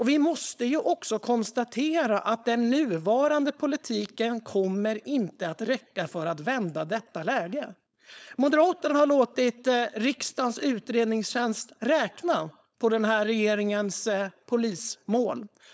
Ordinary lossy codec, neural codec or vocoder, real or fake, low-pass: none; codec, 16 kHz, 4.8 kbps, FACodec; fake; none